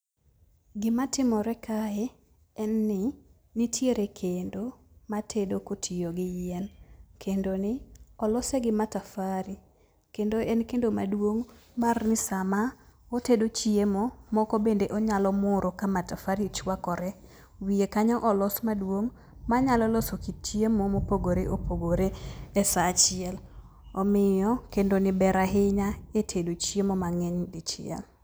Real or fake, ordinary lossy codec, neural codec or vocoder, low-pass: real; none; none; none